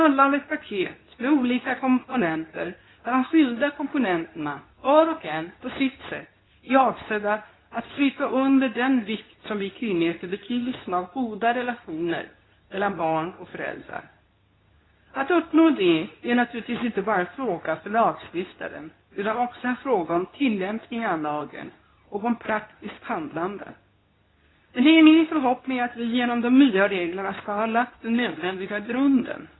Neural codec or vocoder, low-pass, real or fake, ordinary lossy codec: codec, 24 kHz, 0.9 kbps, WavTokenizer, medium speech release version 2; 7.2 kHz; fake; AAC, 16 kbps